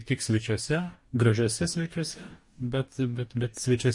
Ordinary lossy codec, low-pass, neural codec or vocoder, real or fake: MP3, 48 kbps; 10.8 kHz; codec, 44.1 kHz, 2.6 kbps, DAC; fake